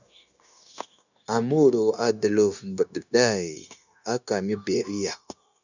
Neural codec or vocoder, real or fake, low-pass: codec, 16 kHz, 0.9 kbps, LongCat-Audio-Codec; fake; 7.2 kHz